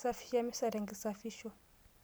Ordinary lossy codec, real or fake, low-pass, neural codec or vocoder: none; real; none; none